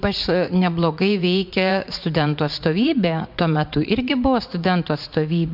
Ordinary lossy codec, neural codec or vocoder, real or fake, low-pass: MP3, 48 kbps; vocoder, 44.1 kHz, 80 mel bands, Vocos; fake; 5.4 kHz